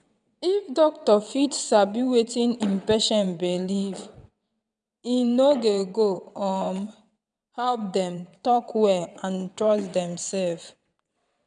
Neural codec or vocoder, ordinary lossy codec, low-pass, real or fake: vocoder, 22.05 kHz, 80 mel bands, WaveNeXt; none; 9.9 kHz; fake